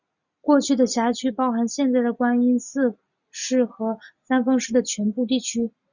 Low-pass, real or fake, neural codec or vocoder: 7.2 kHz; real; none